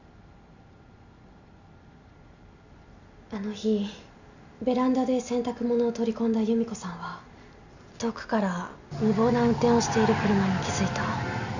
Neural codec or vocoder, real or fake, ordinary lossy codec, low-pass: none; real; none; 7.2 kHz